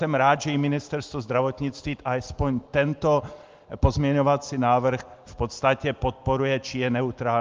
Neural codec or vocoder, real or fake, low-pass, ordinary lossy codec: none; real; 7.2 kHz; Opus, 24 kbps